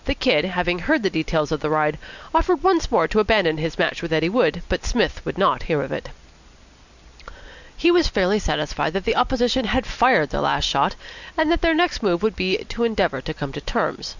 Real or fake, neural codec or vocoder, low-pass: real; none; 7.2 kHz